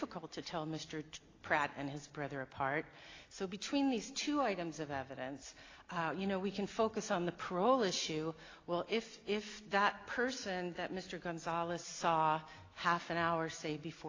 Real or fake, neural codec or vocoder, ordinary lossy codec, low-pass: real; none; AAC, 32 kbps; 7.2 kHz